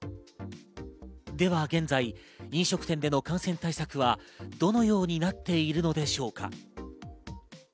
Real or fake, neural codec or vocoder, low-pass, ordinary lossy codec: real; none; none; none